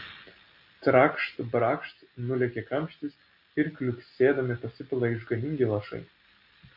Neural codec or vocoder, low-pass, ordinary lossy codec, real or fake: none; 5.4 kHz; MP3, 32 kbps; real